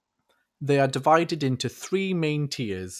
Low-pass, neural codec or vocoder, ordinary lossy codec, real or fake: 14.4 kHz; none; none; real